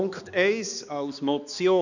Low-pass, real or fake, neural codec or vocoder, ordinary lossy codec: 7.2 kHz; fake; codec, 16 kHz, 2 kbps, X-Codec, HuBERT features, trained on balanced general audio; none